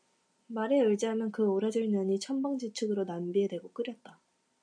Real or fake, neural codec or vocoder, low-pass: real; none; 9.9 kHz